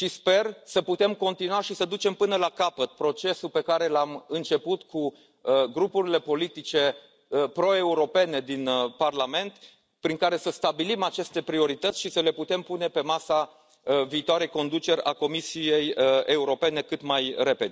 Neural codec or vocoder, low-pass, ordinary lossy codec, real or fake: none; none; none; real